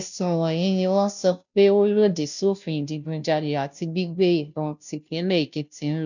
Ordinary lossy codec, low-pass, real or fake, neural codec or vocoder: none; 7.2 kHz; fake; codec, 16 kHz, 0.5 kbps, FunCodec, trained on Chinese and English, 25 frames a second